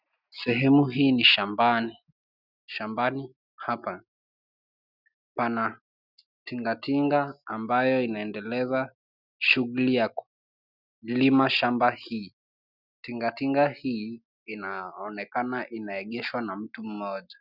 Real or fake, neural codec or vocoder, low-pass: real; none; 5.4 kHz